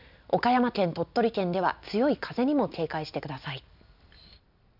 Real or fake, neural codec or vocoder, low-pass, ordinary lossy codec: real; none; 5.4 kHz; none